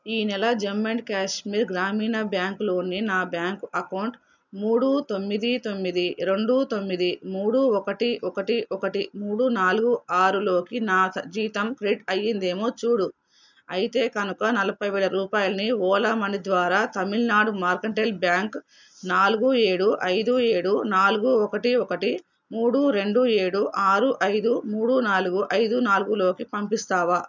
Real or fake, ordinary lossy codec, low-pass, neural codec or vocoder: real; none; 7.2 kHz; none